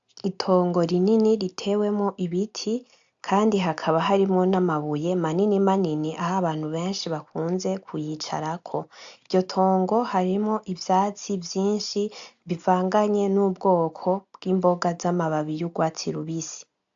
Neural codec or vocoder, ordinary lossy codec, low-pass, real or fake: none; AAC, 64 kbps; 7.2 kHz; real